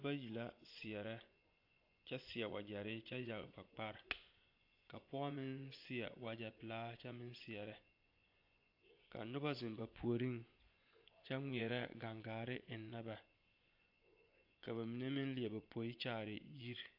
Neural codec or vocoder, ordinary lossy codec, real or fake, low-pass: vocoder, 24 kHz, 100 mel bands, Vocos; AAC, 48 kbps; fake; 5.4 kHz